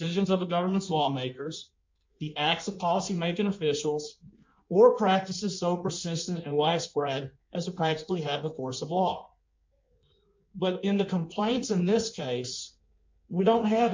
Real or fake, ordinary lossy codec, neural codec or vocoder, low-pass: fake; MP3, 48 kbps; codec, 16 kHz in and 24 kHz out, 1.1 kbps, FireRedTTS-2 codec; 7.2 kHz